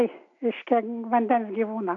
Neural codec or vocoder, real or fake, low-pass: none; real; 7.2 kHz